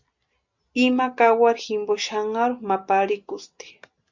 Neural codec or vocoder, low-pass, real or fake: none; 7.2 kHz; real